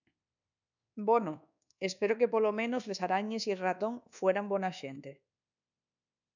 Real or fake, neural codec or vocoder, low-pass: fake; codec, 24 kHz, 1.2 kbps, DualCodec; 7.2 kHz